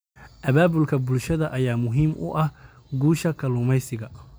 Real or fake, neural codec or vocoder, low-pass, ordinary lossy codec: real; none; none; none